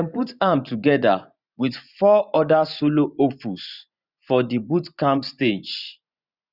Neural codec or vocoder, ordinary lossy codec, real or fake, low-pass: vocoder, 24 kHz, 100 mel bands, Vocos; Opus, 64 kbps; fake; 5.4 kHz